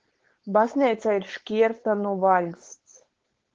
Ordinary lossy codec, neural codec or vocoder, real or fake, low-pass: Opus, 16 kbps; codec, 16 kHz, 4.8 kbps, FACodec; fake; 7.2 kHz